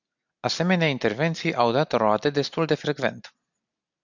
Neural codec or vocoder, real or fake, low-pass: none; real; 7.2 kHz